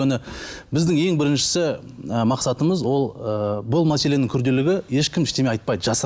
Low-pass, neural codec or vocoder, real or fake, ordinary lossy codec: none; none; real; none